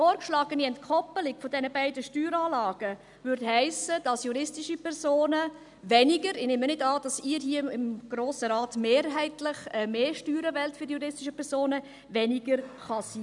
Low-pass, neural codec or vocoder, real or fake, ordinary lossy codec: 10.8 kHz; none; real; none